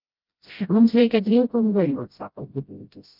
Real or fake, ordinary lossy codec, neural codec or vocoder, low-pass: fake; Opus, 32 kbps; codec, 16 kHz, 0.5 kbps, FreqCodec, smaller model; 5.4 kHz